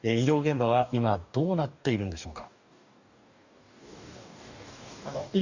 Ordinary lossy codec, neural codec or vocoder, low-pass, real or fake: none; codec, 44.1 kHz, 2.6 kbps, DAC; 7.2 kHz; fake